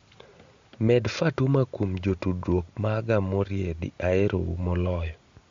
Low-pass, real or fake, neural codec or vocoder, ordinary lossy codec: 7.2 kHz; real; none; MP3, 48 kbps